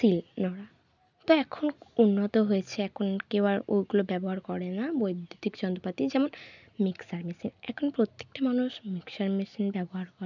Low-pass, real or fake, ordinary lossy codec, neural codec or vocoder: 7.2 kHz; real; none; none